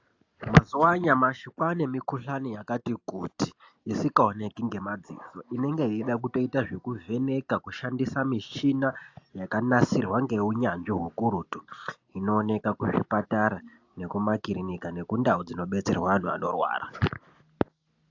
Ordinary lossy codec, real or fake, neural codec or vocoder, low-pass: AAC, 48 kbps; real; none; 7.2 kHz